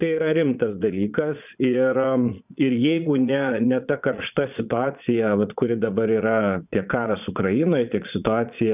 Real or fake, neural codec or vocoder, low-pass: fake; vocoder, 22.05 kHz, 80 mel bands, Vocos; 3.6 kHz